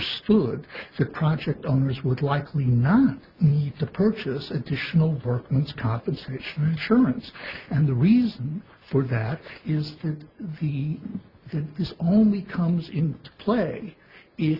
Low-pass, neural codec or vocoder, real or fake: 5.4 kHz; none; real